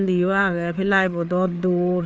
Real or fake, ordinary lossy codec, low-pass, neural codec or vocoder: fake; none; none; codec, 16 kHz, 4 kbps, FreqCodec, larger model